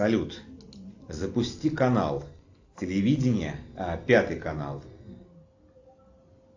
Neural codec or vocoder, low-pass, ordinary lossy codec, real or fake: none; 7.2 kHz; AAC, 48 kbps; real